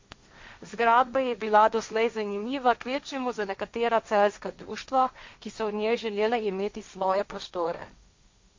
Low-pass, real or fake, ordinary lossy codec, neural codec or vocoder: 7.2 kHz; fake; MP3, 48 kbps; codec, 16 kHz, 1.1 kbps, Voila-Tokenizer